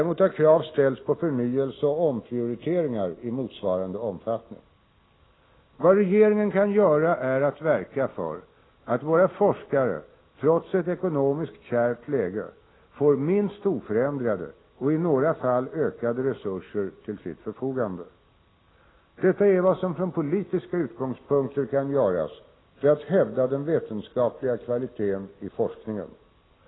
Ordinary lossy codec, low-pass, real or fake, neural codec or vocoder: AAC, 16 kbps; 7.2 kHz; real; none